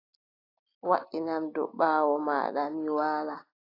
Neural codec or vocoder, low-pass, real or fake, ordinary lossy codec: none; 5.4 kHz; real; AAC, 24 kbps